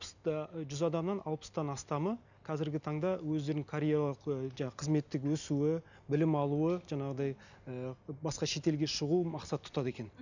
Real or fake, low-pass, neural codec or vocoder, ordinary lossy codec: real; 7.2 kHz; none; none